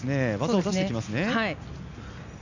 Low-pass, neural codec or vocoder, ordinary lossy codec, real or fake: 7.2 kHz; none; none; real